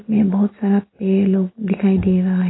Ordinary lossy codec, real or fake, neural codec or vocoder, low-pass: AAC, 16 kbps; real; none; 7.2 kHz